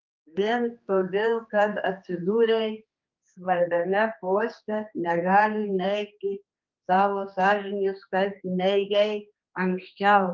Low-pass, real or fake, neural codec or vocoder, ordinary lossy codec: 7.2 kHz; fake; codec, 16 kHz, 2 kbps, X-Codec, HuBERT features, trained on general audio; Opus, 32 kbps